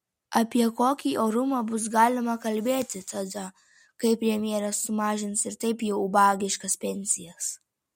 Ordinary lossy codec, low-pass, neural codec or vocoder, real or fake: MP3, 64 kbps; 19.8 kHz; none; real